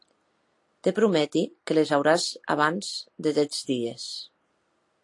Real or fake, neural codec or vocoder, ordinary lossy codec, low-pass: real; none; AAC, 48 kbps; 10.8 kHz